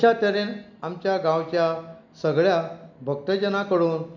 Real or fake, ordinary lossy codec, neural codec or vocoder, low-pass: real; none; none; 7.2 kHz